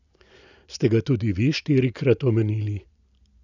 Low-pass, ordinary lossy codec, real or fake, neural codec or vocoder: 7.2 kHz; none; real; none